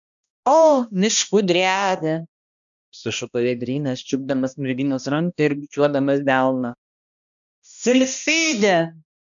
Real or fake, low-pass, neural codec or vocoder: fake; 7.2 kHz; codec, 16 kHz, 1 kbps, X-Codec, HuBERT features, trained on balanced general audio